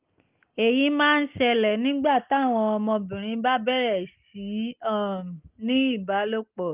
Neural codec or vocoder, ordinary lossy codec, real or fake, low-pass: none; Opus, 24 kbps; real; 3.6 kHz